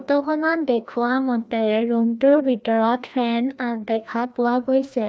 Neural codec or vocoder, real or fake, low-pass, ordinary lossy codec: codec, 16 kHz, 1 kbps, FreqCodec, larger model; fake; none; none